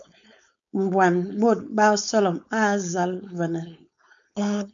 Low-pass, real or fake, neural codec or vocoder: 7.2 kHz; fake; codec, 16 kHz, 4.8 kbps, FACodec